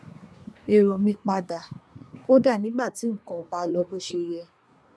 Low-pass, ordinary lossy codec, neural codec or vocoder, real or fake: none; none; codec, 24 kHz, 1 kbps, SNAC; fake